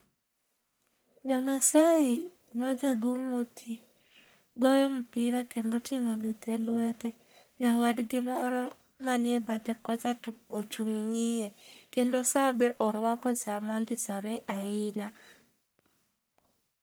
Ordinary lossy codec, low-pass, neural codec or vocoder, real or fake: none; none; codec, 44.1 kHz, 1.7 kbps, Pupu-Codec; fake